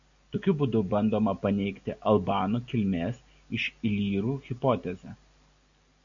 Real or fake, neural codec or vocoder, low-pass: real; none; 7.2 kHz